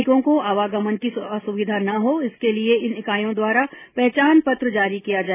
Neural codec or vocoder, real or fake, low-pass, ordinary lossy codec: none; real; 3.6 kHz; none